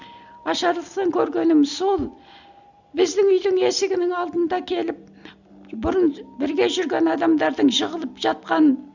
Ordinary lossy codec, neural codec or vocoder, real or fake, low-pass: none; none; real; 7.2 kHz